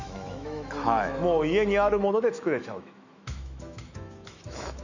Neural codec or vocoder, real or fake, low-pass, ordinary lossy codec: autoencoder, 48 kHz, 128 numbers a frame, DAC-VAE, trained on Japanese speech; fake; 7.2 kHz; none